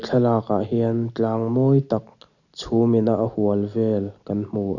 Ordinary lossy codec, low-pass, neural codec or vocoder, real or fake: none; 7.2 kHz; none; real